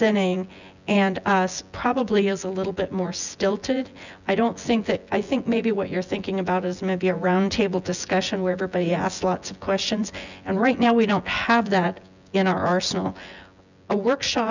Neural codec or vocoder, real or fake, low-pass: vocoder, 24 kHz, 100 mel bands, Vocos; fake; 7.2 kHz